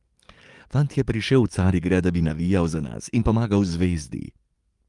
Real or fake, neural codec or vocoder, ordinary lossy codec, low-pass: fake; codec, 44.1 kHz, 7.8 kbps, DAC; Opus, 32 kbps; 10.8 kHz